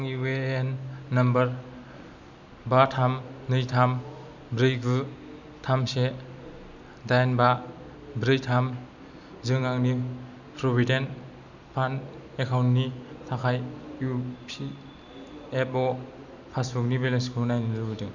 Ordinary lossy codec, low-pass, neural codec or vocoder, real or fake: none; 7.2 kHz; none; real